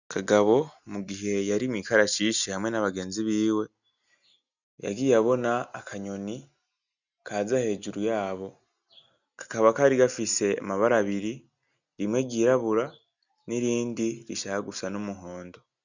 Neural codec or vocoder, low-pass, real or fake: none; 7.2 kHz; real